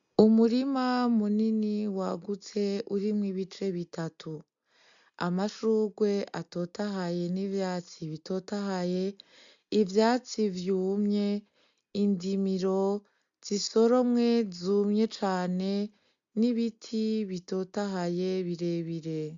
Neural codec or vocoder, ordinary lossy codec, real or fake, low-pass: none; MP3, 64 kbps; real; 7.2 kHz